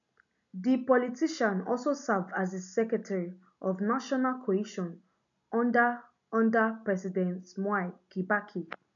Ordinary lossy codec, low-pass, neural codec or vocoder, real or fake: none; 7.2 kHz; none; real